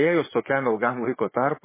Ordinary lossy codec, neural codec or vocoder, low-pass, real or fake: MP3, 16 kbps; vocoder, 44.1 kHz, 80 mel bands, Vocos; 3.6 kHz; fake